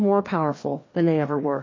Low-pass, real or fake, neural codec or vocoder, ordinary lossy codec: 7.2 kHz; fake; codec, 16 kHz, 2 kbps, FreqCodec, larger model; MP3, 32 kbps